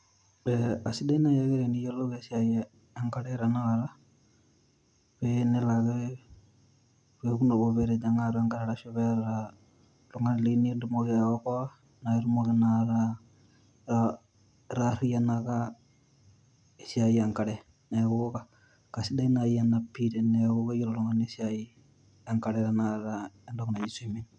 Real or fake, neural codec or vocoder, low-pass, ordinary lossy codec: real; none; 9.9 kHz; none